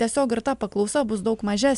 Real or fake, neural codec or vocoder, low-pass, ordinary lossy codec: real; none; 10.8 kHz; AAC, 96 kbps